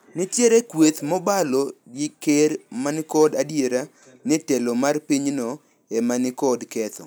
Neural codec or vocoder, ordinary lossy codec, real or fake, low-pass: none; none; real; none